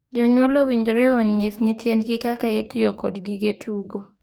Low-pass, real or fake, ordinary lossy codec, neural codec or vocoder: none; fake; none; codec, 44.1 kHz, 2.6 kbps, DAC